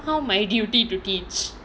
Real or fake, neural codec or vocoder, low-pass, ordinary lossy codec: real; none; none; none